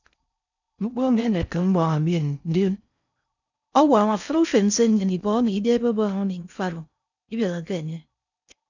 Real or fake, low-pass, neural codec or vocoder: fake; 7.2 kHz; codec, 16 kHz in and 24 kHz out, 0.6 kbps, FocalCodec, streaming, 4096 codes